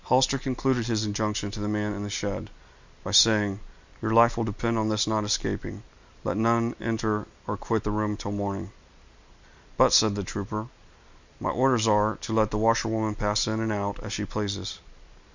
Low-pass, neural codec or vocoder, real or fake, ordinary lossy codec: 7.2 kHz; none; real; Opus, 64 kbps